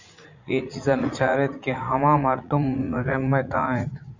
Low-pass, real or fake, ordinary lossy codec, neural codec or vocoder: 7.2 kHz; fake; Opus, 64 kbps; vocoder, 44.1 kHz, 80 mel bands, Vocos